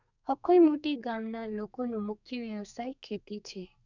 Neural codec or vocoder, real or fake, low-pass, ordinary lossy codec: codec, 32 kHz, 1.9 kbps, SNAC; fake; 7.2 kHz; none